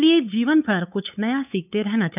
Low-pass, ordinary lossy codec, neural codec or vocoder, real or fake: 3.6 kHz; none; codec, 16 kHz, 8 kbps, FunCodec, trained on LibriTTS, 25 frames a second; fake